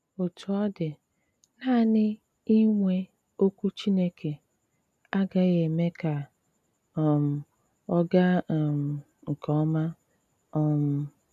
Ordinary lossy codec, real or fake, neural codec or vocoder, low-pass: none; real; none; 14.4 kHz